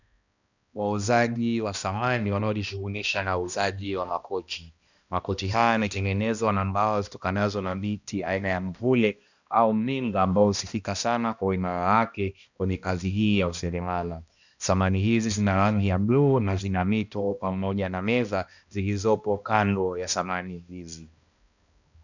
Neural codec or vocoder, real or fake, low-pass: codec, 16 kHz, 1 kbps, X-Codec, HuBERT features, trained on balanced general audio; fake; 7.2 kHz